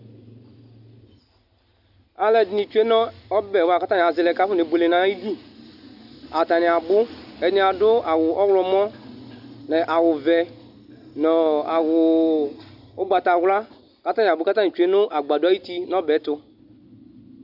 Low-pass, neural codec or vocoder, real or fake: 5.4 kHz; none; real